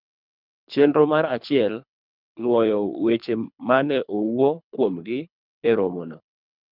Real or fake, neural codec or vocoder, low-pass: fake; codec, 24 kHz, 3 kbps, HILCodec; 5.4 kHz